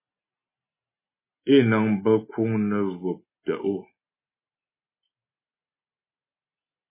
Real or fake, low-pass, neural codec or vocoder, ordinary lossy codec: real; 3.6 kHz; none; MP3, 16 kbps